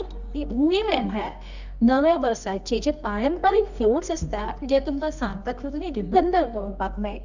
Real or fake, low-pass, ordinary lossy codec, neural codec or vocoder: fake; 7.2 kHz; none; codec, 24 kHz, 0.9 kbps, WavTokenizer, medium music audio release